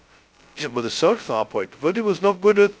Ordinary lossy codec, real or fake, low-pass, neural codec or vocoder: none; fake; none; codec, 16 kHz, 0.2 kbps, FocalCodec